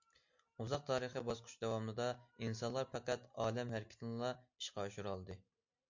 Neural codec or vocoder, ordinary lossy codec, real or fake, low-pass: none; MP3, 48 kbps; real; 7.2 kHz